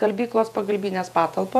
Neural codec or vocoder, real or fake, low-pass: vocoder, 48 kHz, 128 mel bands, Vocos; fake; 14.4 kHz